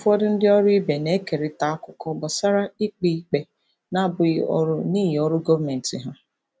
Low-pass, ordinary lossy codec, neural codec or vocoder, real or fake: none; none; none; real